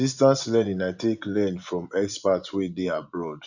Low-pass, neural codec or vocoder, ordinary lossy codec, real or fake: 7.2 kHz; vocoder, 24 kHz, 100 mel bands, Vocos; none; fake